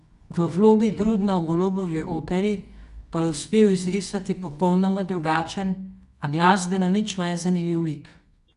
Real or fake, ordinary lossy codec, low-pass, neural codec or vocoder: fake; none; 10.8 kHz; codec, 24 kHz, 0.9 kbps, WavTokenizer, medium music audio release